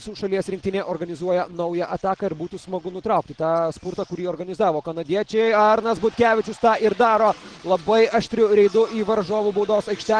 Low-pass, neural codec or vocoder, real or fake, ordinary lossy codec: 9.9 kHz; none; real; Opus, 16 kbps